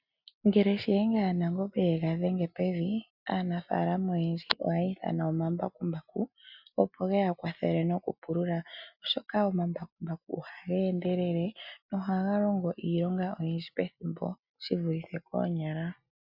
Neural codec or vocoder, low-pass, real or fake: none; 5.4 kHz; real